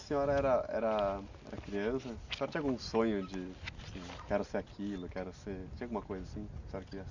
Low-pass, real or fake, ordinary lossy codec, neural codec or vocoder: 7.2 kHz; real; none; none